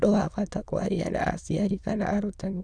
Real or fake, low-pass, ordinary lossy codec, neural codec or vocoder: fake; 9.9 kHz; none; autoencoder, 22.05 kHz, a latent of 192 numbers a frame, VITS, trained on many speakers